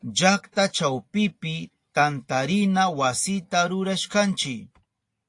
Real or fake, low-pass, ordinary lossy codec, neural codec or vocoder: real; 10.8 kHz; AAC, 48 kbps; none